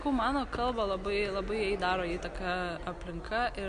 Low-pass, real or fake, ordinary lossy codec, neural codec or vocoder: 9.9 kHz; real; AAC, 96 kbps; none